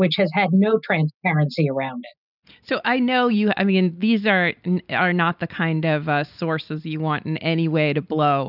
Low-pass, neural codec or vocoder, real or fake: 5.4 kHz; none; real